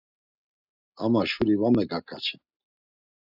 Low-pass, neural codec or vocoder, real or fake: 5.4 kHz; none; real